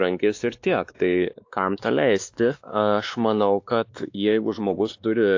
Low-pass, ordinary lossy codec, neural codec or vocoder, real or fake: 7.2 kHz; AAC, 48 kbps; codec, 16 kHz, 2 kbps, X-Codec, WavLM features, trained on Multilingual LibriSpeech; fake